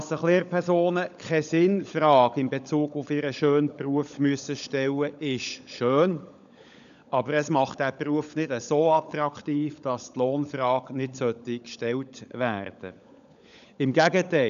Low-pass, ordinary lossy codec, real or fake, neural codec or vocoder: 7.2 kHz; none; fake; codec, 16 kHz, 16 kbps, FunCodec, trained on LibriTTS, 50 frames a second